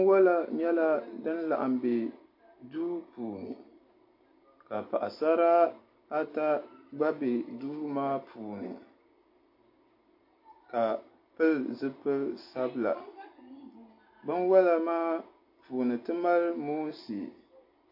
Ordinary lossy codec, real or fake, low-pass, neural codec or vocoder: AAC, 32 kbps; real; 5.4 kHz; none